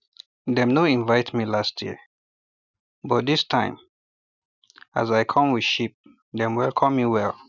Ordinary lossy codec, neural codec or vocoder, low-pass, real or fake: none; none; 7.2 kHz; real